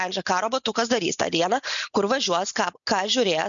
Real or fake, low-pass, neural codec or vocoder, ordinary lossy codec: real; 7.2 kHz; none; MP3, 96 kbps